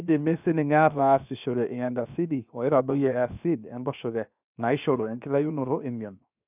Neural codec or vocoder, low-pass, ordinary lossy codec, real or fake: codec, 16 kHz, 0.7 kbps, FocalCodec; 3.6 kHz; none; fake